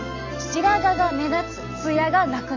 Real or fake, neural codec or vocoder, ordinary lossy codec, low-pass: real; none; MP3, 64 kbps; 7.2 kHz